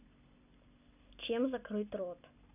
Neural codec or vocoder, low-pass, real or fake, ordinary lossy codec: none; 3.6 kHz; real; none